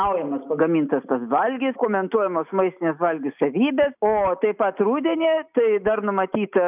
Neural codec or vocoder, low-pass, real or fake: none; 3.6 kHz; real